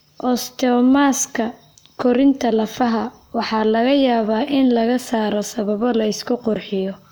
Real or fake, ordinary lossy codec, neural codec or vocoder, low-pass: fake; none; codec, 44.1 kHz, 7.8 kbps, Pupu-Codec; none